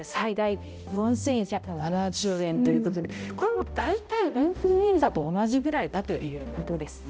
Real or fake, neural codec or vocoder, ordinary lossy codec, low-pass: fake; codec, 16 kHz, 0.5 kbps, X-Codec, HuBERT features, trained on balanced general audio; none; none